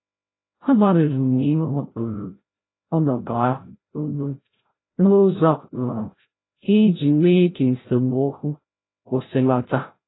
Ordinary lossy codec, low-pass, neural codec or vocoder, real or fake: AAC, 16 kbps; 7.2 kHz; codec, 16 kHz, 0.5 kbps, FreqCodec, larger model; fake